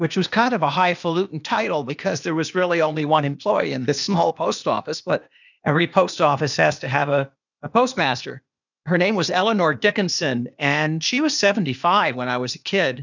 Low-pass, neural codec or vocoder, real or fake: 7.2 kHz; codec, 16 kHz, 0.8 kbps, ZipCodec; fake